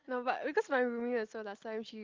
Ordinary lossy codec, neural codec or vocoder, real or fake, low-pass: Opus, 32 kbps; none; real; 7.2 kHz